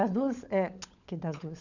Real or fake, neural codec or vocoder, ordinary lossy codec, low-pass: fake; codec, 16 kHz, 16 kbps, FunCodec, trained on LibriTTS, 50 frames a second; none; 7.2 kHz